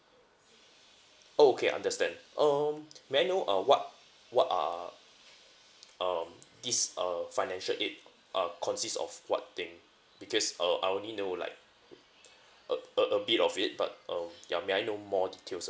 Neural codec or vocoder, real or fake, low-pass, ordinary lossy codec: none; real; none; none